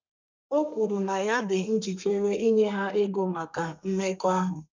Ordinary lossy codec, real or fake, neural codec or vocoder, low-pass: MP3, 64 kbps; fake; codec, 32 kHz, 1.9 kbps, SNAC; 7.2 kHz